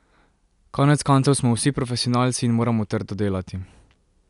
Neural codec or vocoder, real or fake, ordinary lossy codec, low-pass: none; real; none; 10.8 kHz